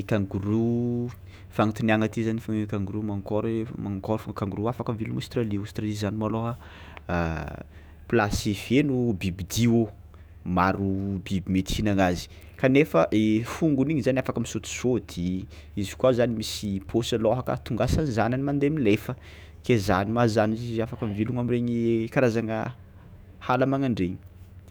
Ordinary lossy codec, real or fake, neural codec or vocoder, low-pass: none; fake; autoencoder, 48 kHz, 128 numbers a frame, DAC-VAE, trained on Japanese speech; none